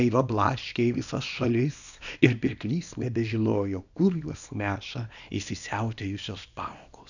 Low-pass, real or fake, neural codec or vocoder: 7.2 kHz; fake; codec, 24 kHz, 0.9 kbps, WavTokenizer, small release